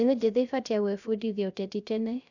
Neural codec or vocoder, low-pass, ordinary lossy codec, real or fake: codec, 16 kHz, 0.3 kbps, FocalCodec; 7.2 kHz; none; fake